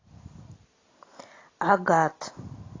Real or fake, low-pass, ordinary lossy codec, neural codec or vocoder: real; 7.2 kHz; AAC, 32 kbps; none